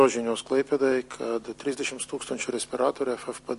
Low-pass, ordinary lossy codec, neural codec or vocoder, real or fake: 14.4 kHz; MP3, 48 kbps; vocoder, 44.1 kHz, 128 mel bands every 256 samples, BigVGAN v2; fake